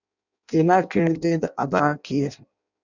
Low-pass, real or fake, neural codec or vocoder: 7.2 kHz; fake; codec, 16 kHz in and 24 kHz out, 0.6 kbps, FireRedTTS-2 codec